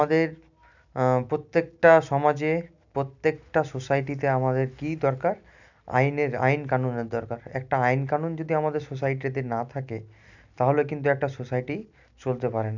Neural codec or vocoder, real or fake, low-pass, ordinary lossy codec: none; real; 7.2 kHz; none